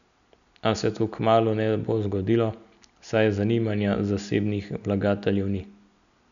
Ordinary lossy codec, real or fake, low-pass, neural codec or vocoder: MP3, 96 kbps; real; 7.2 kHz; none